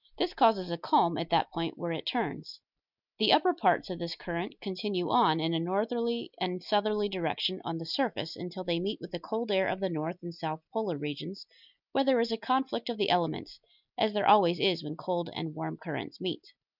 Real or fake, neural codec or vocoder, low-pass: real; none; 5.4 kHz